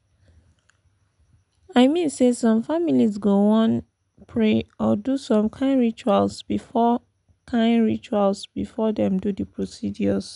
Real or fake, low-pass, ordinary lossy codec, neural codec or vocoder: real; 10.8 kHz; none; none